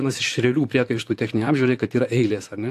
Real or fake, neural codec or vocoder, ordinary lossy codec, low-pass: fake; vocoder, 44.1 kHz, 128 mel bands, Pupu-Vocoder; AAC, 64 kbps; 14.4 kHz